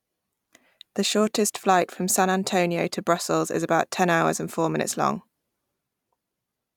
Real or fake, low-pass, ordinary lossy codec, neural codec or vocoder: fake; 19.8 kHz; none; vocoder, 44.1 kHz, 128 mel bands every 256 samples, BigVGAN v2